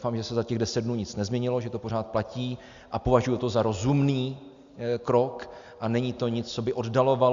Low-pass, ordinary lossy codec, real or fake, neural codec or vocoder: 7.2 kHz; Opus, 64 kbps; real; none